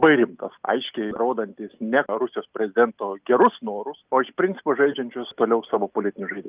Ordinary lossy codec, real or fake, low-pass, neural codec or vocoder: Opus, 24 kbps; real; 3.6 kHz; none